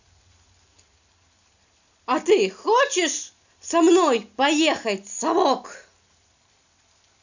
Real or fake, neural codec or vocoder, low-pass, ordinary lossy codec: real; none; 7.2 kHz; none